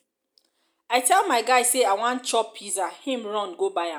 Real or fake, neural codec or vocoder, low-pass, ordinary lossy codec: real; none; none; none